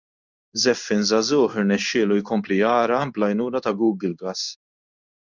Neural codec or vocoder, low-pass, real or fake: codec, 16 kHz in and 24 kHz out, 1 kbps, XY-Tokenizer; 7.2 kHz; fake